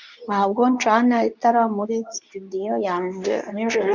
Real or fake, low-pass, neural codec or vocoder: fake; 7.2 kHz; codec, 24 kHz, 0.9 kbps, WavTokenizer, medium speech release version 2